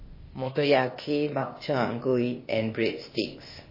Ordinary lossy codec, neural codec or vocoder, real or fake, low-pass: MP3, 24 kbps; codec, 16 kHz, 0.8 kbps, ZipCodec; fake; 5.4 kHz